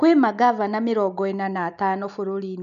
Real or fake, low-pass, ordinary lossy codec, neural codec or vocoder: real; 7.2 kHz; none; none